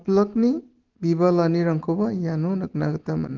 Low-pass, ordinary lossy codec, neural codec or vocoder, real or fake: 7.2 kHz; Opus, 16 kbps; none; real